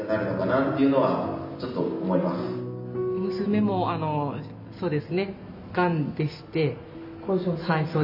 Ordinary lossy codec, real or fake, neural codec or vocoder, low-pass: none; real; none; 5.4 kHz